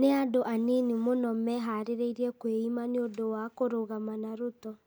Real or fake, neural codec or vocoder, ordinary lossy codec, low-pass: real; none; none; none